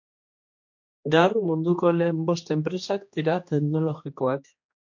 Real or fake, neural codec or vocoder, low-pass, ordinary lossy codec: fake; codec, 16 kHz, 2 kbps, X-Codec, HuBERT features, trained on general audio; 7.2 kHz; MP3, 48 kbps